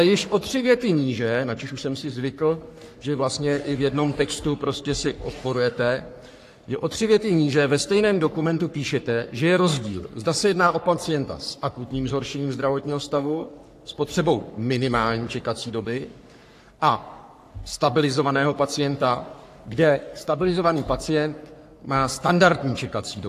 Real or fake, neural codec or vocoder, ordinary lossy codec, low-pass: fake; codec, 44.1 kHz, 3.4 kbps, Pupu-Codec; AAC, 64 kbps; 14.4 kHz